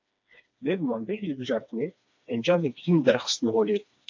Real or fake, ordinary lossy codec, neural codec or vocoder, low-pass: fake; AAC, 48 kbps; codec, 16 kHz, 2 kbps, FreqCodec, smaller model; 7.2 kHz